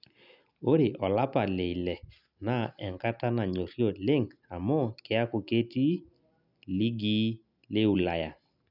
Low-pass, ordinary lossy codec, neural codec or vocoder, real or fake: 5.4 kHz; none; none; real